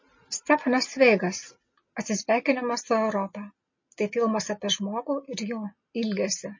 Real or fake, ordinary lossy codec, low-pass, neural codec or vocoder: real; MP3, 32 kbps; 7.2 kHz; none